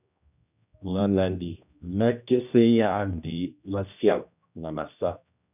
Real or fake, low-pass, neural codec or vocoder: fake; 3.6 kHz; codec, 16 kHz, 1 kbps, X-Codec, HuBERT features, trained on general audio